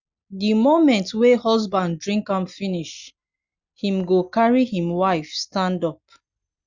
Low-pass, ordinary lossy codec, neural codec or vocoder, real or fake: 7.2 kHz; Opus, 64 kbps; none; real